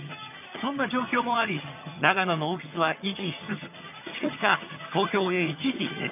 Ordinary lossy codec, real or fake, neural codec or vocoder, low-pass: none; fake; vocoder, 22.05 kHz, 80 mel bands, HiFi-GAN; 3.6 kHz